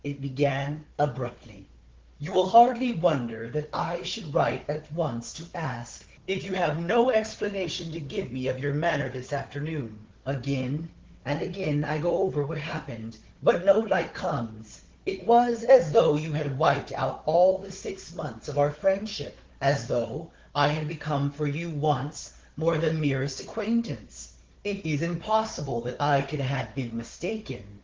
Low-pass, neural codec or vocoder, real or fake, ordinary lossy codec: 7.2 kHz; codec, 16 kHz, 4 kbps, FunCodec, trained on Chinese and English, 50 frames a second; fake; Opus, 16 kbps